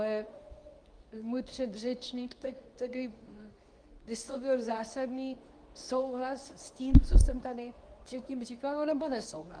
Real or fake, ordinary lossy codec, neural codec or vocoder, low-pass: fake; Opus, 32 kbps; codec, 24 kHz, 0.9 kbps, WavTokenizer, medium speech release version 2; 9.9 kHz